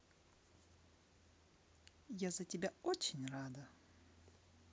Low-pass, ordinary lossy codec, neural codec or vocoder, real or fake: none; none; none; real